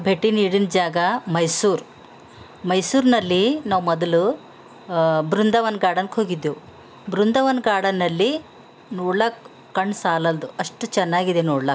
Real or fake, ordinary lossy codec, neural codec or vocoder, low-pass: real; none; none; none